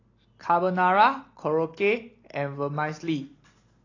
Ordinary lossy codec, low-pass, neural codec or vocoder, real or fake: AAC, 32 kbps; 7.2 kHz; none; real